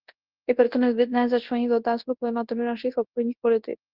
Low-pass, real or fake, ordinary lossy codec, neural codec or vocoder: 5.4 kHz; fake; Opus, 16 kbps; codec, 24 kHz, 0.9 kbps, WavTokenizer, large speech release